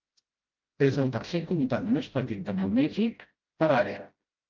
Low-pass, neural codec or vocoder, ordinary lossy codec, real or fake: 7.2 kHz; codec, 16 kHz, 0.5 kbps, FreqCodec, smaller model; Opus, 24 kbps; fake